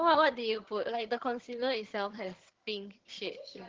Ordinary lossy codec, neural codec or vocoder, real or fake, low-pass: Opus, 16 kbps; vocoder, 22.05 kHz, 80 mel bands, HiFi-GAN; fake; 7.2 kHz